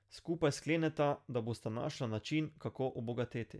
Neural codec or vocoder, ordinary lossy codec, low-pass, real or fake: none; none; none; real